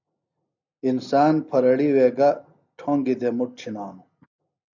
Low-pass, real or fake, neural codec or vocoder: 7.2 kHz; real; none